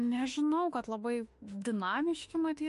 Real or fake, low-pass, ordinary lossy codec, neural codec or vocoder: fake; 14.4 kHz; MP3, 48 kbps; autoencoder, 48 kHz, 32 numbers a frame, DAC-VAE, trained on Japanese speech